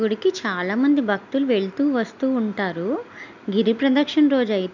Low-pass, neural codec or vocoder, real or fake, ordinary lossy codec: 7.2 kHz; none; real; none